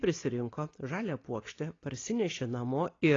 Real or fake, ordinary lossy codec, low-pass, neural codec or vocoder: real; AAC, 32 kbps; 7.2 kHz; none